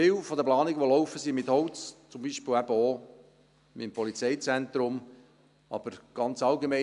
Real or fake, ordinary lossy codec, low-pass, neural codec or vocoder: real; none; 10.8 kHz; none